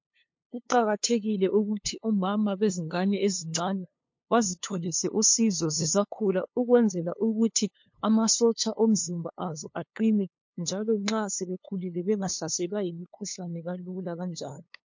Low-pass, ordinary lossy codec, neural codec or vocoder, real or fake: 7.2 kHz; MP3, 48 kbps; codec, 16 kHz, 2 kbps, FunCodec, trained on LibriTTS, 25 frames a second; fake